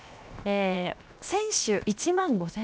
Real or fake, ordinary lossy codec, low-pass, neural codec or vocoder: fake; none; none; codec, 16 kHz, 0.7 kbps, FocalCodec